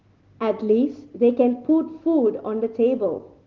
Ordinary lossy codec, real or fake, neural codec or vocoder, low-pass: Opus, 16 kbps; real; none; 7.2 kHz